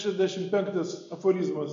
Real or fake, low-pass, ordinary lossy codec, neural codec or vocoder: real; 7.2 kHz; AAC, 48 kbps; none